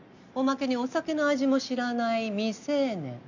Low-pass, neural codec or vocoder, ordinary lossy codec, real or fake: 7.2 kHz; none; none; real